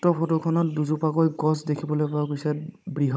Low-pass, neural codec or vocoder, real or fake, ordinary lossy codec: none; codec, 16 kHz, 16 kbps, FunCodec, trained on Chinese and English, 50 frames a second; fake; none